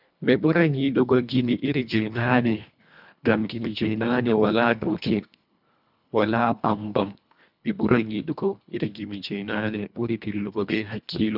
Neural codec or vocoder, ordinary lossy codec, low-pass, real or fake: codec, 24 kHz, 1.5 kbps, HILCodec; none; 5.4 kHz; fake